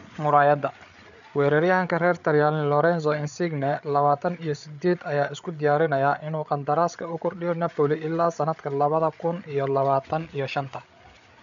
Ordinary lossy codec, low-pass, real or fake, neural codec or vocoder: none; 7.2 kHz; fake; codec, 16 kHz, 8 kbps, FreqCodec, larger model